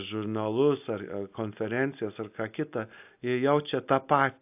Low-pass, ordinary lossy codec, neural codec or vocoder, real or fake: 3.6 kHz; AAC, 32 kbps; none; real